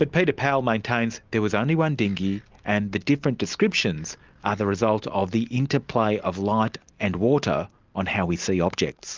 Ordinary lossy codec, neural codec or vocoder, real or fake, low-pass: Opus, 24 kbps; none; real; 7.2 kHz